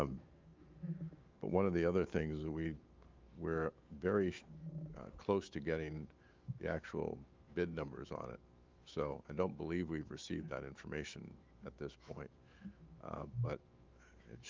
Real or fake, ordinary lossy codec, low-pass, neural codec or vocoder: fake; Opus, 24 kbps; 7.2 kHz; autoencoder, 48 kHz, 128 numbers a frame, DAC-VAE, trained on Japanese speech